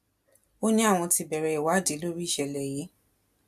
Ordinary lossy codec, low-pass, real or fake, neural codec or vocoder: MP3, 96 kbps; 14.4 kHz; real; none